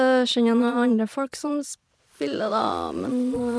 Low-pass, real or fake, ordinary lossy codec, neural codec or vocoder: none; fake; none; vocoder, 22.05 kHz, 80 mel bands, Vocos